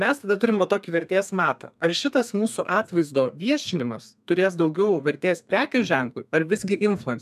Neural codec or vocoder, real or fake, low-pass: codec, 32 kHz, 1.9 kbps, SNAC; fake; 14.4 kHz